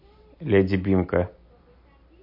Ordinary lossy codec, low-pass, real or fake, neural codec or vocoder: MP3, 32 kbps; 5.4 kHz; real; none